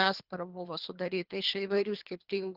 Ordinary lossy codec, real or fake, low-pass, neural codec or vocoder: Opus, 32 kbps; fake; 5.4 kHz; codec, 24 kHz, 3 kbps, HILCodec